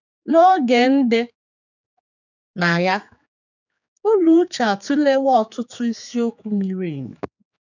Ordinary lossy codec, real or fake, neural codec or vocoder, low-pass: none; fake; codec, 16 kHz, 4 kbps, X-Codec, HuBERT features, trained on general audio; 7.2 kHz